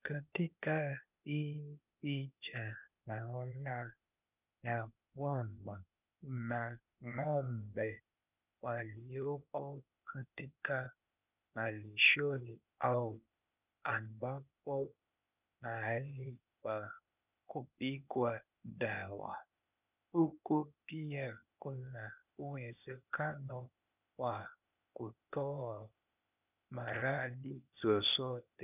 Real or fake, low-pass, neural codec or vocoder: fake; 3.6 kHz; codec, 16 kHz, 0.8 kbps, ZipCodec